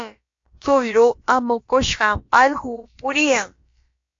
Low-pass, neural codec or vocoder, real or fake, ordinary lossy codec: 7.2 kHz; codec, 16 kHz, about 1 kbps, DyCAST, with the encoder's durations; fake; AAC, 48 kbps